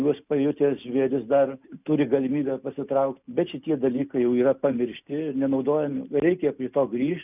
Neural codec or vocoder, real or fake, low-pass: none; real; 3.6 kHz